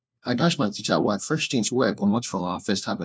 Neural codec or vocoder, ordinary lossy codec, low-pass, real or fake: codec, 16 kHz, 1 kbps, FunCodec, trained on LibriTTS, 50 frames a second; none; none; fake